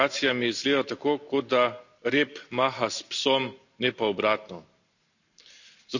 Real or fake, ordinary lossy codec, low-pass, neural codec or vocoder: real; none; 7.2 kHz; none